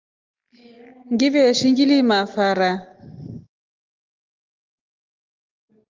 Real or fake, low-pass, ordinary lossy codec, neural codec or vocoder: real; 7.2 kHz; Opus, 24 kbps; none